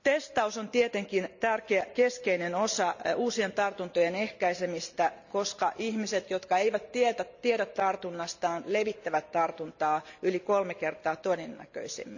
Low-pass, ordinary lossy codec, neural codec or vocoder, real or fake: 7.2 kHz; none; none; real